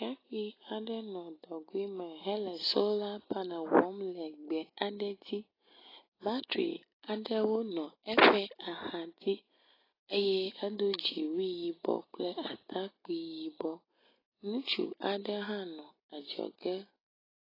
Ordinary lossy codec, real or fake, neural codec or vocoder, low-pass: AAC, 24 kbps; real; none; 5.4 kHz